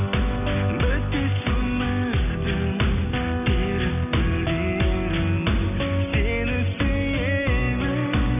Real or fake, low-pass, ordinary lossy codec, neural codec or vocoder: real; 3.6 kHz; none; none